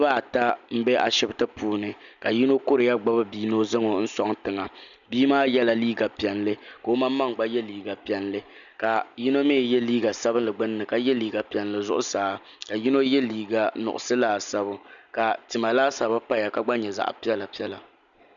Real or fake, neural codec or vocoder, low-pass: real; none; 7.2 kHz